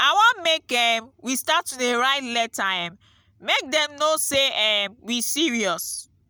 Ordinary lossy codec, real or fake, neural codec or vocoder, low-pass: none; real; none; none